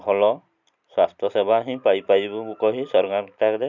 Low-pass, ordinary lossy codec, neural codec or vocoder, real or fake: 7.2 kHz; none; none; real